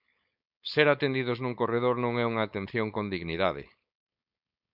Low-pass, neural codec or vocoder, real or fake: 5.4 kHz; codec, 16 kHz, 4.8 kbps, FACodec; fake